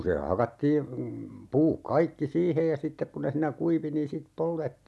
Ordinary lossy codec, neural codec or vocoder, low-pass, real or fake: none; none; none; real